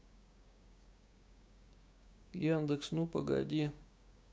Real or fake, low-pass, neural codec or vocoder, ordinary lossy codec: fake; none; codec, 16 kHz, 6 kbps, DAC; none